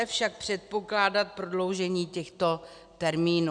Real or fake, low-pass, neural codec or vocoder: real; 9.9 kHz; none